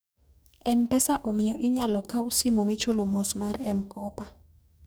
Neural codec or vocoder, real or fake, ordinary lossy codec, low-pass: codec, 44.1 kHz, 2.6 kbps, DAC; fake; none; none